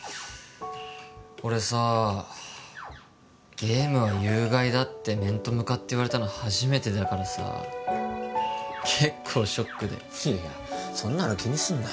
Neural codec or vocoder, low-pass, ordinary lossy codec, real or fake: none; none; none; real